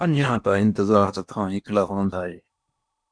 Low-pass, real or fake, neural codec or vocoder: 9.9 kHz; fake; codec, 16 kHz in and 24 kHz out, 0.8 kbps, FocalCodec, streaming, 65536 codes